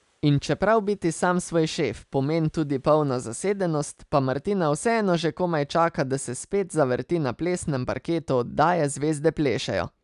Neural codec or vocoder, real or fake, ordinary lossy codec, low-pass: none; real; none; 10.8 kHz